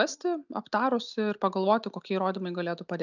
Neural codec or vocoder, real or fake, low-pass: none; real; 7.2 kHz